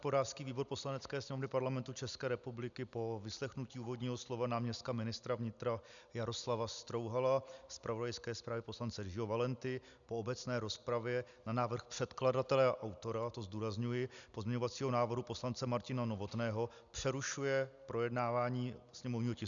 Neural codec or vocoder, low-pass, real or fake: none; 7.2 kHz; real